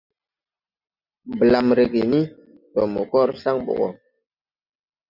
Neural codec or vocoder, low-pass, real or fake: none; 5.4 kHz; real